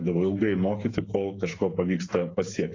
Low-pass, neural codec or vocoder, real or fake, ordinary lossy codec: 7.2 kHz; codec, 16 kHz, 8 kbps, FreqCodec, smaller model; fake; AAC, 32 kbps